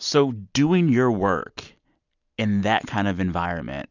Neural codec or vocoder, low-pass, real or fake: none; 7.2 kHz; real